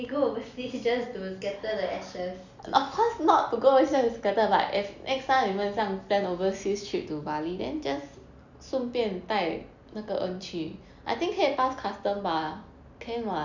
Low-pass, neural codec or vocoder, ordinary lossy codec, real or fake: 7.2 kHz; none; none; real